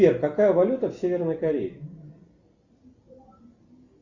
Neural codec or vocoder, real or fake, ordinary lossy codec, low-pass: none; real; Opus, 64 kbps; 7.2 kHz